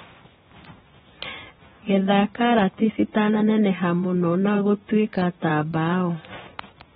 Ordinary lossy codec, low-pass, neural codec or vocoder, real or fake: AAC, 16 kbps; 10.8 kHz; vocoder, 24 kHz, 100 mel bands, Vocos; fake